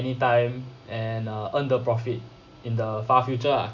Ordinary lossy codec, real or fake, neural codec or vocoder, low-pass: MP3, 48 kbps; real; none; 7.2 kHz